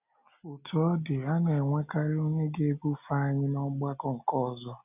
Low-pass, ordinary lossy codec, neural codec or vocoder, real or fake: 3.6 kHz; none; none; real